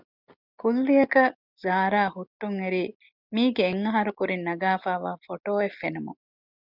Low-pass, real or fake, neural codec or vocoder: 5.4 kHz; real; none